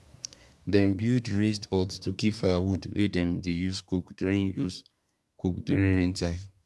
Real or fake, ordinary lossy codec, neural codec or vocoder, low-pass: fake; none; codec, 24 kHz, 1 kbps, SNAC; none